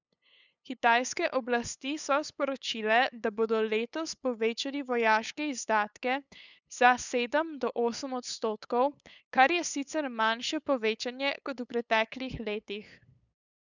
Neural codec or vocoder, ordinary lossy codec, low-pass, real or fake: codec, 16 kHz, 8 kbps, FunCodec, trained on LibriTTS, 25 frames a second; none; 7.2 kHz; fake